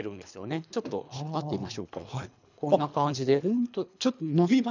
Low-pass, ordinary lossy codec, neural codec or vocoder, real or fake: 7.2 kHz; none; codec, 24 kHz, 3 kbps, HILCodec; fake